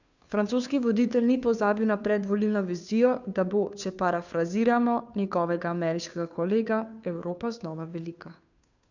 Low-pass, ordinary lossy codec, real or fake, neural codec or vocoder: 7.2 kHz; none; fake; codec, 16 kHz, 2 kbps, FunCodec, trained on Chinese and English, 25 frames a second